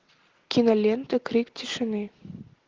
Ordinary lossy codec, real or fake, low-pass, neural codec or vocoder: Opus, 16 kbps; real; 7.2 kHz; none